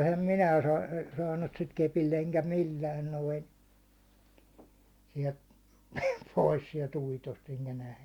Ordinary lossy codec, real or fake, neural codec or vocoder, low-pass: Opus, 64 kbps; real; none; 19.8 kHz